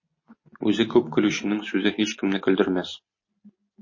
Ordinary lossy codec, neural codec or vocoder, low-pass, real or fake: MP3, 32 kbps; none; 7.2 kHz; real